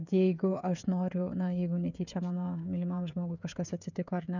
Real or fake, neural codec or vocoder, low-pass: fake; codec, 16 kHz, 16 kbps, FreqCodec, smaller model; 7.2 kHz